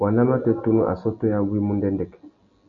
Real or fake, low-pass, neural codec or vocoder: real; 7.2 kHz; none